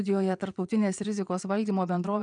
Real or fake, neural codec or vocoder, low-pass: fake; vocoder, 22.05 kHz, 80 mel bands, Vocos; 9.9 kHz